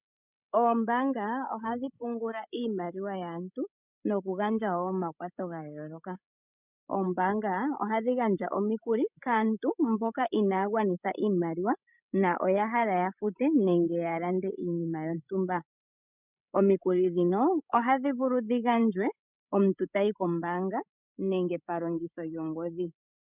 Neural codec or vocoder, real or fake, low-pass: codec, 16 kHz, 16 kbps, FreqCodec, larger model; fake; 3.6 kHz